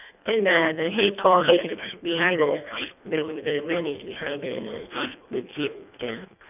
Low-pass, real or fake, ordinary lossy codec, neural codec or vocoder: 3.6 kHz; fake; none; codec, 24 kHz, 1.5 kbps, HILCodec